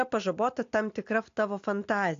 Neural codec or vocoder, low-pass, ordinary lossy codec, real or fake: none; 7.2 kHz; AAC, 48 kbps; real